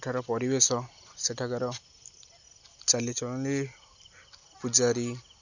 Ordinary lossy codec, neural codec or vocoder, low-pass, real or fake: none; none; 7.2 kHz; real